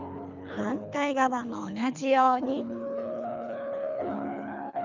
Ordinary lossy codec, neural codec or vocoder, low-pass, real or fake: none; codec, 24 kHz, 3 kbps, HILCodec; 7.2 kHz; fake